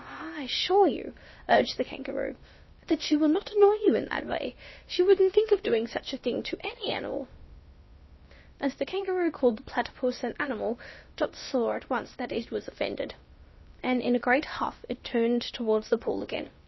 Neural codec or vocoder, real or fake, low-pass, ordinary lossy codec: codec, 16 kHz, about 1 kbps, DyCAST, with the encoder's durations; fake; 7.2 kHz; MP3, 24 kbps